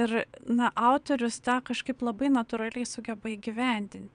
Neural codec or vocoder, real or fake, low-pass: vocoder, 22.05 kHz, 80 mel bands, WaveNeXt; fake; 9.9 kHz